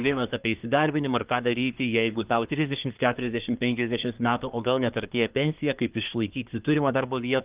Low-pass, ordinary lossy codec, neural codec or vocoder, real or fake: 3.6 kHz; Opus, 32 kbps; codec, 24 kHz, 1 kbps, SNAC; fake